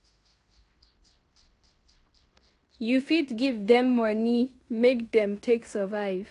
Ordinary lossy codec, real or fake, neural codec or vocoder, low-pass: AAC, 48 kbps; fake; codec, 16 kHz in and 24 kHz out, 0.9 kbps, LongCat-Audio-Codec, fine tuned four codebook decoder; 10.8 kHz